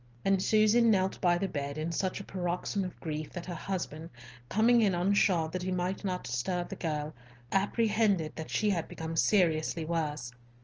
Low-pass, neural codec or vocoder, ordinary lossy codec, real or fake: 7.2 kHz; none; Opus, 24 kbps; real